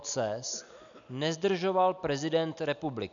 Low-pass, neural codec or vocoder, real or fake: 7.2 kHz; none; real